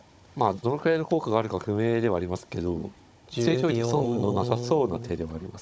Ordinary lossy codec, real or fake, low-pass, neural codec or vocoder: none; fake; none; codec, 16 kHz, 16 kbps, FunCodec, trained on Chinese and English, 50 frames a second